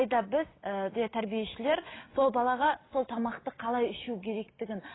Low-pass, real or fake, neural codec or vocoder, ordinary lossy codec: 7.2 kHz; real; none; AAC, 16 kbps